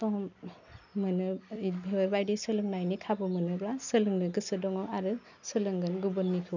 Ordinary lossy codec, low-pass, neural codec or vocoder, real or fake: none; 7.2 kHz; none; real